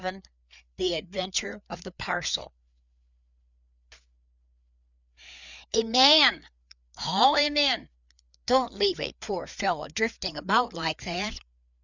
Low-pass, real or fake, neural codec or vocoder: 7.2 kHz; fake; codec, 16 kHz, 4 kbps, FreqCodec, larger model